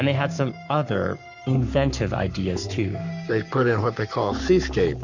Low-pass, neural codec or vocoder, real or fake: 7.2 kHz; codec, 44.1 kHz, 7.8 kbps, Pupu-Codec; fake